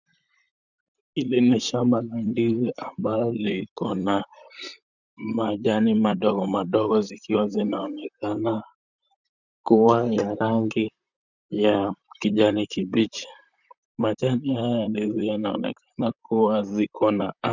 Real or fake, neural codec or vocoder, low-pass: fake; vocoder, 44.1 kHz, 128 mel bands, Pupu-Vocoder; 7.2 kHz